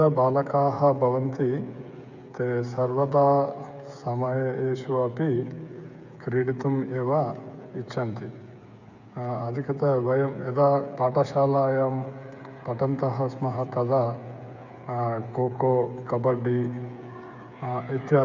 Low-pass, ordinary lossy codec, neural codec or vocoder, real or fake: 7.2 kHz; none; codec, 16 kHz, 8 kbps, FreqCodec, smaller model; fake